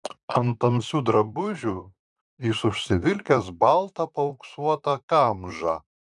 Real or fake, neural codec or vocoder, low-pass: fake; vocoder, 44.1 kHz, 128 mel bands, Pupu-Vocoder; 10.8 kHz